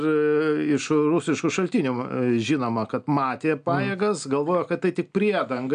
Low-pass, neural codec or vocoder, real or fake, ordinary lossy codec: 9.9 kHz; none; real; MP3, 96 kbps